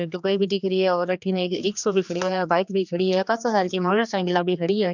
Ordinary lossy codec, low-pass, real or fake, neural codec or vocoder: none; 7.2 kHz; fake; codec, 16 kHz, 2 kbps, X-Codec, HuBERT features, trained on general audio